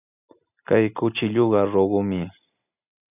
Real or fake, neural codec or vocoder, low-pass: real; none; 3.6 kHz